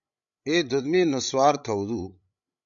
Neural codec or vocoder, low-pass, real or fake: codec, 16 kHz, 16 kbps, FreqCodec, larger model; 7.2 kHz; fake